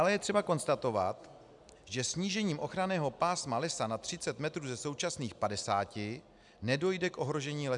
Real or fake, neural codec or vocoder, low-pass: real; none; 10.8 kHz